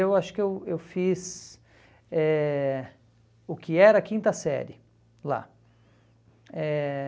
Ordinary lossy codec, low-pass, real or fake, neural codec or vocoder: none; none; real; none